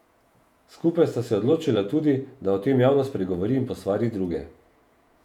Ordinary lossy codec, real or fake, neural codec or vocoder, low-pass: none; fake; vocoder, 48 kHz, 128 mel bands, Vocos; 19.8 kHz